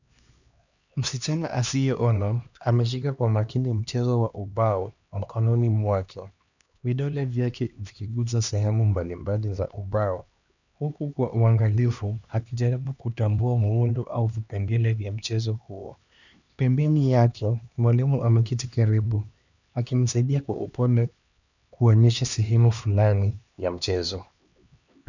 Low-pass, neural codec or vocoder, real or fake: 7.2 kHz; codec, 16 kHz, 2 kbps, X-Codec, HuBERT features, trained on LibriSpeech; fake